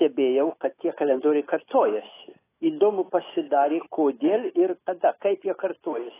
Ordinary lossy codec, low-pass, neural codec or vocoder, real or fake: AAC, 16 kbps; 3.6 kHz; autoencoder, 48 kHz, 128 numbers a frame, DAC-VAE, trained on Japanese speech; fake